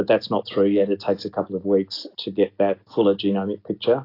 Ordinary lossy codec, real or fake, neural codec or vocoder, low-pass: AAC, 32 kbps; real; none; 5.4 kHz